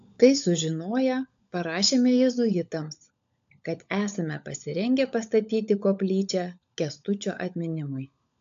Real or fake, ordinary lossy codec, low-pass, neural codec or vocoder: fake; AAC, 64 kbps; 7.2 kHz; codec, 16 kHz, 16 kbps, FunCodec, trained on LibriTTS, 50 frames a second